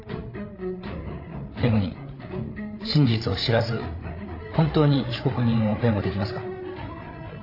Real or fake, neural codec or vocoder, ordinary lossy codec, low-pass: fake; codec, 16 kHz, 8 kbps, FreqCodec, larger model; AAC, 32 kbps; 5.4 kHz